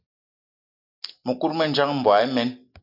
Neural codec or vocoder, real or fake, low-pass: none; real; 5.4 kHz